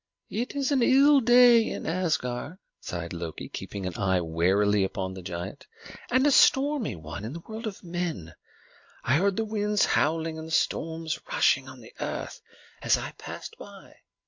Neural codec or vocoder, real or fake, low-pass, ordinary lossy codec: none; real; 7.2 kHz; MP3, 48 kbps